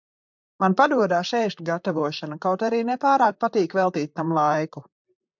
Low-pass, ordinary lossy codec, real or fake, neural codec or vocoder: 7.2 kHz; MP3, 64 kbps; fake; vocoder, 44.1 kHz, 128 mel bands, Pupu-Vocoder